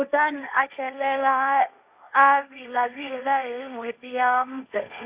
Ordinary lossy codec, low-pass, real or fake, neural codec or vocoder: Opus, 64 kbps; 3.6 kHz; fake; codec, 16 kHz, 1.1 kbps, Voila-Tokenizer